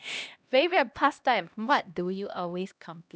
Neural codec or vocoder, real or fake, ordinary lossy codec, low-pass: codec, 16 kHz, 1 kbps, X-Codec, HuBERT features, trained on LibriSpeech; fake; none; none